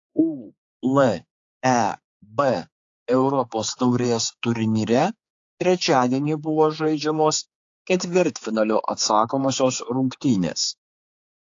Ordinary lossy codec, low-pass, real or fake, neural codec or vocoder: AAC, 48 kbps; 7.2 kHz; fake; codec, 16 kHz, 4 kbps, X-Codec, HuBERT features, trained on general audio